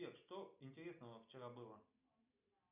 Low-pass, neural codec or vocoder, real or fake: 3.6 kHz; none; real